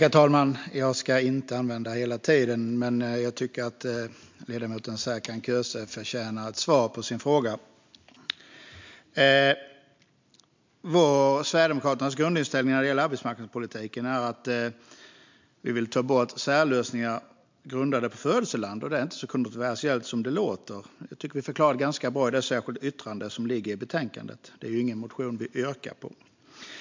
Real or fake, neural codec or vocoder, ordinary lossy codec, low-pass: real; none; MP3, 64 kbps; 7.2 kHz